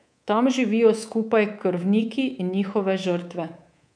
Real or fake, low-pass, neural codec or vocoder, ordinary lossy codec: fake; 9.9 kHz; codec, 24 kHz, 3.1 kbps, DualCodec; none